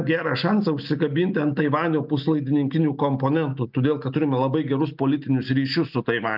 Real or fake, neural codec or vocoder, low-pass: real; none; 5.4 kHz